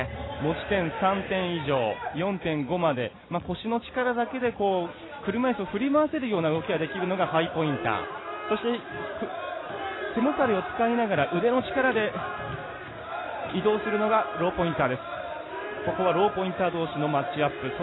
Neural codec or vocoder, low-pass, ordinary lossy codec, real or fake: none; 7.2 kHz; AAC, 16 kbps; real